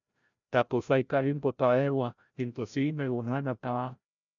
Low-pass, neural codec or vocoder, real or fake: 7.2 kHz; codec, 16 kHz, 0.5 kbps, FreqCodec, larger model; fake